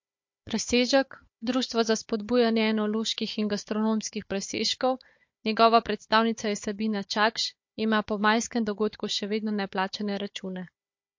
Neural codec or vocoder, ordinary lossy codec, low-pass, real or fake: codec, 16 kHz, 4 kbps, FunCodec, trained on Chinese and English, 50 frames a second; MP3, 48 kbps; 7.2 kHz; fake